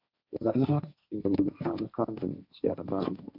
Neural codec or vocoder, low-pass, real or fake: codec, 16 kHz, 1.1 kbps, Voila-Tokenizer; 5.4 kHz; fake